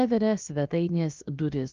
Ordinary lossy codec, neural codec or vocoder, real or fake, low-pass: Opus, 32 kbps; codec, 16 kHz, about 1 kbps, DyCAST, with the encoder's durations; fake; 7.2 kHz